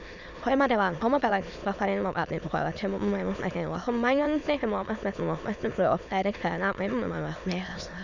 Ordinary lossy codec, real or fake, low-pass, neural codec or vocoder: none; fake; 7.2 kHz; autoencoder, 22.05 kHz, a latent of 192 numbers a frame, VITS, trained on many speakers